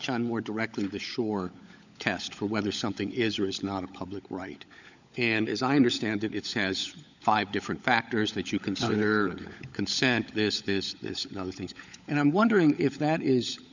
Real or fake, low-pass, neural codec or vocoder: fake; 7.2 kHz; codec, 16 kHz, 16 kbps, FunCodec, trained on LibriTTS, 50 frames a second